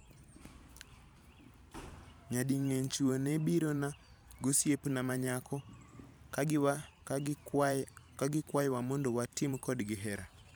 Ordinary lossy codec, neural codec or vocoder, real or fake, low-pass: none; vocoder, 44.1 kHz, 128 mel bands every 512 samples, BigVGAN v2; fake; none